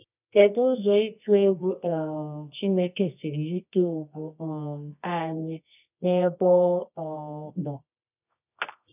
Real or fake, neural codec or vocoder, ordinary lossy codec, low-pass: fake; codec, 24 kHz, 0.9 kbps, WavTokenizer, medium music audio release; none; 3.6 kHz